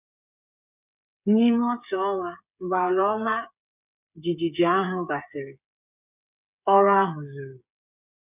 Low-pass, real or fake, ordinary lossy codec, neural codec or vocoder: 3.6 kHz; fake; none; codec, 16 kHz, 8 kbps, FreqCodec, smaller model